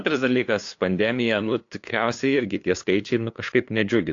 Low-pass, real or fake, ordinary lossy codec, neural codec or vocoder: 7.2 kHz; fake; AAC, 48 kbps; codec, 16 kHz, 2 kbps, FunCodec, trained on LibriTTS, 25 frames a second